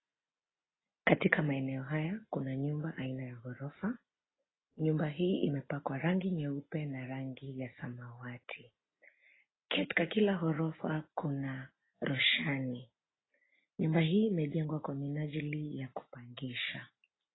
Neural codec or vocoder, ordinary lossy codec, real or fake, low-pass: none; AAC, 16 kbps; real; 7.2 kHz